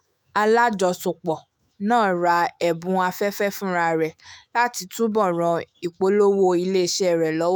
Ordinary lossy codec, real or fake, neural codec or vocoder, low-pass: none; fake; autoencoder, 48 kHz, 128 numbers a frame, DAC-VAE, trained on Japanese speech; none